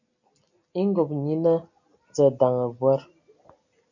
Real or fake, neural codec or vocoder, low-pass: real; none; 7.2 kHz